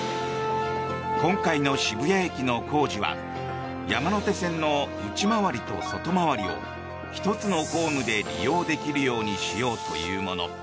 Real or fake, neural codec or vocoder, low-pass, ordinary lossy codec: real; none; none; none